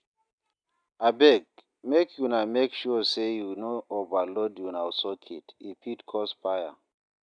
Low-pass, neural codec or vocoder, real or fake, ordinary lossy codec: 9.9 kHz; none; real; none